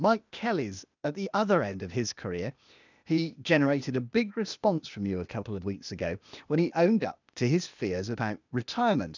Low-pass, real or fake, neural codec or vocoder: 7.2 kHz; fake; codec, 16 kHz, 0.8 kbps, ZipCodec